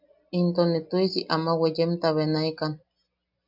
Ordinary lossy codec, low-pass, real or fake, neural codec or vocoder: AAC, 48 kbps; 5.4 kHz; real; none